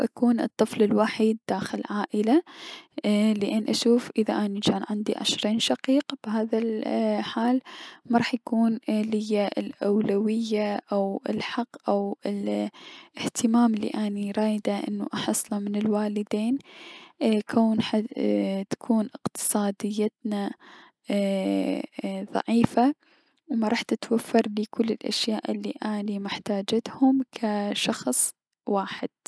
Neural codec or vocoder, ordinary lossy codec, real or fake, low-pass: none; none; real; none